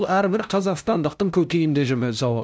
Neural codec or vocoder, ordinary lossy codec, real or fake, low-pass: codec, 16 kHz, 0.5 kbps, FunCodec, trained on LibriTTS, 25 frames a second; none; fake; none